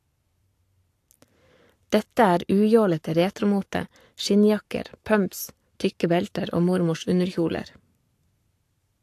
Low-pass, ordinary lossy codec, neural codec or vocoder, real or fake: 14.4 kHz; AAC, 64 kbps; codec, 44.1 kHz, 7.8 kbps, Pupu-Codec; fake